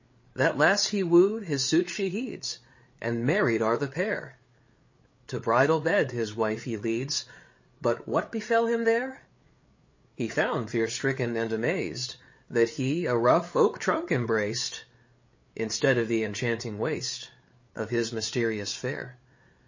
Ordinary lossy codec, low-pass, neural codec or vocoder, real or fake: MP3, 32 kbps; 7.2 kHz; codec, 16 kHz, 8 kbps, FreqCodec, larger model; fake